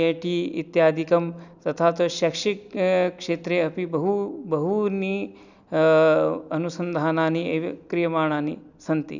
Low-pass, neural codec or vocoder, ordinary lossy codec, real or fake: 7.2 kHz; none; none; real